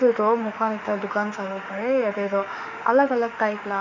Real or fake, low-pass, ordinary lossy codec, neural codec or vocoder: fake; 7.2 kHz; none; autoencoder, 48 kHz, 32 numbers a frame, DAC-VAE, trained on Japanese speech